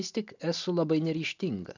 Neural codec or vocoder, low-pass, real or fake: none; 7.2 kHz; real